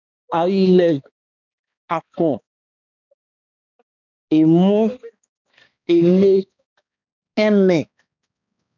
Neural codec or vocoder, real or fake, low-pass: codec, 16 kHz, 2 kbps, X-Codec, HuBERT features, trained on balanced general audio; fake; 7.2 kHz